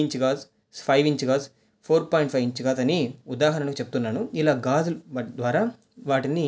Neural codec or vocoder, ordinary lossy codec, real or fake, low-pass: none; none; real; none